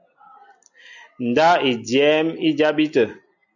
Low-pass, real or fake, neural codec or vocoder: 7.2 kHz; real; none